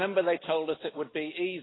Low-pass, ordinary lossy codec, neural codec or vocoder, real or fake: 7.2 kHz; AAC, 16 kbps; none; real